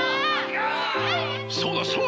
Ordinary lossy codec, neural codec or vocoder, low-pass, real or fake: none; none; none; real